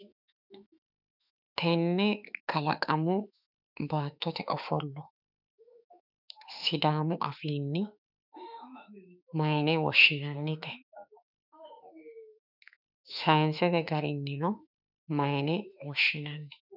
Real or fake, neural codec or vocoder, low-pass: fake; autoencoder, 48 kHz, 32 numbers a frame, DAC-VAE, trained on Japanese speech; 5.4 kHz